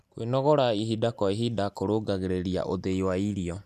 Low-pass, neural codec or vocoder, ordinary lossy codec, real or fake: 14.4 kHz; none; none; real